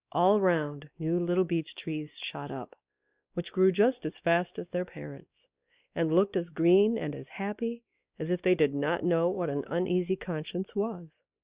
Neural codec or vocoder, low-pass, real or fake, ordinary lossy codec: codec, 16 kHz, 2 kbps, X-Codec, WavLM features, trained on Multilingual LibriSpeech; 3.6 kHz; fake; Opus, 64 kbps